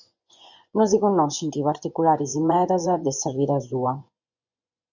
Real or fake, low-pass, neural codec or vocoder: fake; 7.2 kHz; vocoder, 24 kHz, 100 mel bands, Vocos